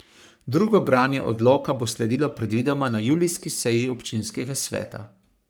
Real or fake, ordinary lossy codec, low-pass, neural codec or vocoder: fake; none; none; codec, 44.1 kHz, 3.4 kbps, Pupu-Codec